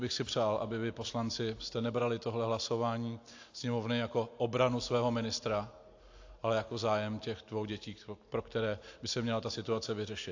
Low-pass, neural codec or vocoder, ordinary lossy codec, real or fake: 7.2 kHz; none; AAC, 48 kbps; real